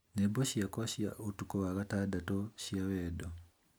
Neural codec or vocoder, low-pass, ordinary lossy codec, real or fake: none; none; none; real